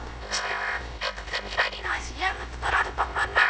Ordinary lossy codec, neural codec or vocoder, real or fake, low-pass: none; codec, 16 kHz, 0.3 kbps, FocalCodec; fake; none